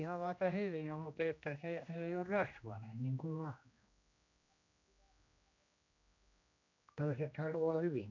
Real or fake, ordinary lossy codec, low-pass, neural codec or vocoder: fake; MP3, 64 kbps; 7.2 kHz; codec, 16 kHz, 1 kbps, X-Codec, HuBERT features, trained on general audio